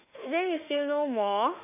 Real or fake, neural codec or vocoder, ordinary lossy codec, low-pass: fake; autoencoder, 48 kHz, 32 numbers a frame, DAC-VAE, trained on Japanese speech; none; 3.6 kHz